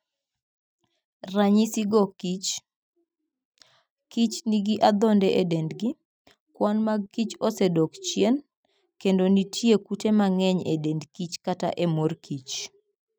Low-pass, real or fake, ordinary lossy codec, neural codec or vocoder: none; real; none; none